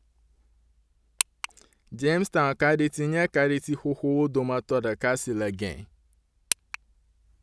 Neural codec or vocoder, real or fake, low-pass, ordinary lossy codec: none; real; none; none